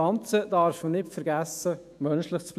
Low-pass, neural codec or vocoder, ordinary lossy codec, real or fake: 14.4 kHz; autoencoder, 48 kHz, 128 numbers a frame, DAC-VAE, trained on Japanese speech; none; fake